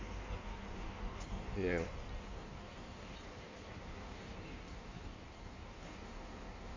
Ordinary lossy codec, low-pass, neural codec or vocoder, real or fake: MP3, 48 kbps; 7.2 kHz; codec, 16 kHz in and 24 kHz out, 1.1 kbps, FireRedTTS-2 codec; fake